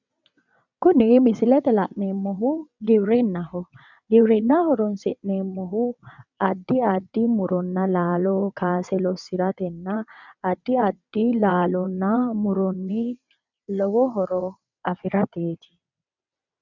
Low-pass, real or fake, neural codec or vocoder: 7.2 kHz; fake; vocoder, 22.05 kHz, 80 mel bands, WaveNeXt